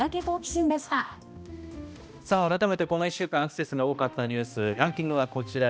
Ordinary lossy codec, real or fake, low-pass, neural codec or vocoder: none; fake; none; codec, 16 kHz, 1 kbps, X-Codec, HuBERT features, trained on balanced general audio